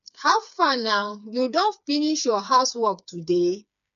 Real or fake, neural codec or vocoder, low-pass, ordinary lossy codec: fake; codec, 16 kHz, 4 kbps, FreqCodec, smaller model; 7.2 kHz; none